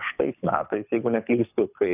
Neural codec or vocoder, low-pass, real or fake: codec, 24 kHz, 6 kbps, HILCodec; 3.6 kHz; fake